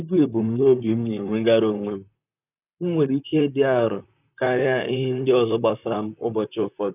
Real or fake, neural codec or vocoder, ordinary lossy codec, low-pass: fake; vocoder, 44.1 kHz, 128 mel bands, Pupu-Vocoder; none; 3.6 kHz